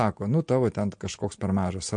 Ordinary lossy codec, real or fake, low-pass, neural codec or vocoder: MP3, 48 kbps; real; 9.9 kHz; none